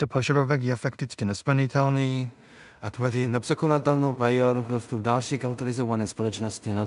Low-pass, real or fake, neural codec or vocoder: 10.8 kHz; fake; codec, 16 kHz in and 24 kHz out, 0.4 kbps, LongCat-Audio-Codec, two codebook decoder